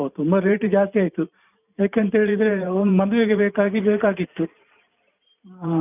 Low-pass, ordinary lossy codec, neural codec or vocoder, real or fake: 3.6 kHz; none; vocoder, 44.1 kHz, 128 mel bands every 512 samples, BigVGAN v2; fake